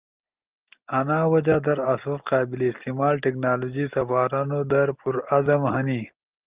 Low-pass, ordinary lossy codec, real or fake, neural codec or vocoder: 3.6 kHz; Opus, 32 kbps; real; none